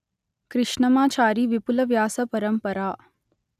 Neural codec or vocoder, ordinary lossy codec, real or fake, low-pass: none; none; real; 14.4 kHz